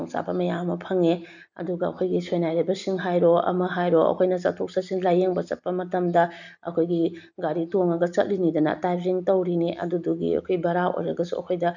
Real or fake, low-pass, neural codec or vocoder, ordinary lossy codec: real; 7.2 kHz; none; none